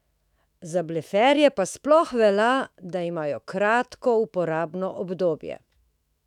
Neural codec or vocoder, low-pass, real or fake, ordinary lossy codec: autoencoder, 48 kHz, 128 numbers a frame, DAC-VAE, trained on Japanese speech; 19.8 kHz; fake; none